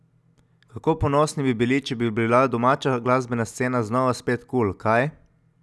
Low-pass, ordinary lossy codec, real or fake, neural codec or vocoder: none; none; real; none